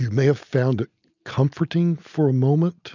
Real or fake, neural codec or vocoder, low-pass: real; none; 7.2 kHz